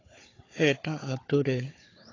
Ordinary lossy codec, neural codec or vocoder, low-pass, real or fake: AAC, 32 kbps; codec, 16 kHz, 4 kbps, FunCodec, trained on Chinese and English, 50 frames a second; 7.2 kHz; fake